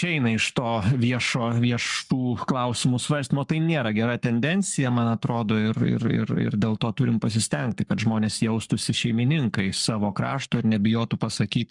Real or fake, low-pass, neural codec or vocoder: fake; 10.8 kHz; codec, 44.1 kHz, 7.8 kbps, Pupu-Codec